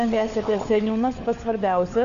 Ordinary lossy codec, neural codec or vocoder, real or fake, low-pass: AAC, 96 kbps; codec, 16 kHz, 4 kbps, FunCodec, trained on LibriTTS, 50 frames a second; fake; 7.2 kHz